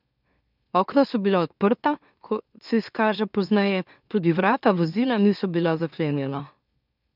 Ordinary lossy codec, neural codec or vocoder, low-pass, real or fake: none; autoencoder, 44.1 kHz, a latent of 192 numbers a frame, MeloTTS; 5.4 kHz; fake